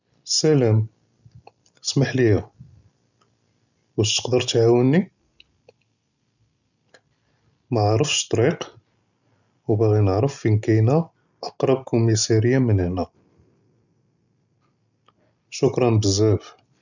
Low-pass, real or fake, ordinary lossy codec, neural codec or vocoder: 7.2 kHz; real; none; none